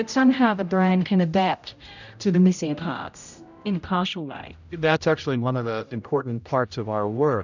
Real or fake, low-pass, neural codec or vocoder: fake; 7.2 kHz; codec, 16 kHz, 0.5 kbps, X-Codec, HuBERT features, trained on general audio